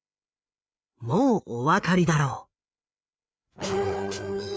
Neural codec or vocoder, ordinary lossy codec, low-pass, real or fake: codec, 16 kHz, 4 kbps, FreqCodec, larger model; none; none; fake